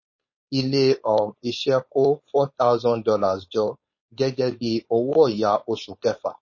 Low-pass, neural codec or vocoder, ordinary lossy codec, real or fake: 7.2 kHz; codec, 16 kHz, 4.8 kbps, FACodec; MP3, 32 kbps; fake